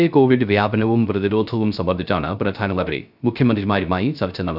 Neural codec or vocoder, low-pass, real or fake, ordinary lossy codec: codec, 16 kHz, 0.3 kbps, FocalCodec; 5.4 kHz; fake; none